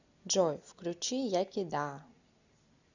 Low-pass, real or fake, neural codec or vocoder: 7.2 kHz; real; none